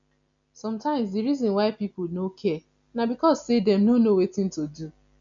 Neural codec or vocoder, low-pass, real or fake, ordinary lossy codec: none; 7.2 kHz; real; none